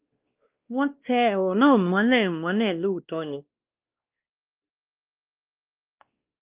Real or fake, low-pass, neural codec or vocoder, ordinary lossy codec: fake; 3.6 kHz; codec, 16 kHz, 1 kbps, X-Codec, WavLM features, trained on Multilingual LibriSpeech; Opus, 32 kbps